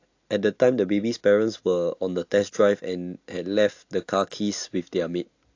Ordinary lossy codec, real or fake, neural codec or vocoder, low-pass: AAC, 48 kbps; real; none; 7.2 kHz